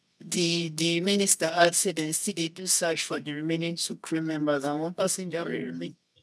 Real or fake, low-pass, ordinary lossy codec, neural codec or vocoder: fake; none; none; codec, 24 kHz, 0.9 kbps, WavTokenizer, medium music audio release